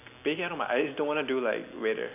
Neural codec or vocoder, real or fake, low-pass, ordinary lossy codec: none; real; 3.6 kHz; none